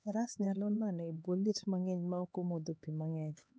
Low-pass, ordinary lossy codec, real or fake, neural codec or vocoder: none; none; fake; codec, 16 kHz, 4 kbps, X-Codec, HuBERT features, trained on LibriSpeech